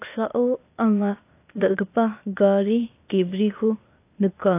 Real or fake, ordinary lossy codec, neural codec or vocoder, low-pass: fake; AAC, 24 kbps; codec, 16 kHz, 0.8 kbps, ZipCodec; 3.6 kHz